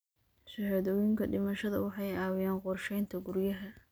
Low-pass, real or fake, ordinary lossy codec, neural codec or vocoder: none; real; none; none